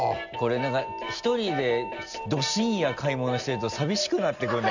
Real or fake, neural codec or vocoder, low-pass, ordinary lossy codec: real; none; 7.2 kHz; none